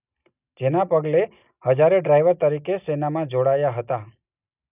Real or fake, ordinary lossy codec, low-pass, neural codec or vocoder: real; none; 3.6 kHz; none